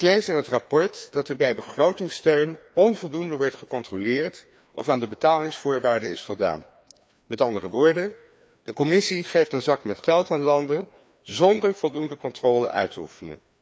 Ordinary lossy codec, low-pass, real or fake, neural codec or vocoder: none; none; fake; codec, 16 kHz, 2 kbps, FreqCodec, larger model